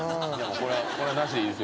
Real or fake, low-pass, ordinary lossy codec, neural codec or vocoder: real; none; none; none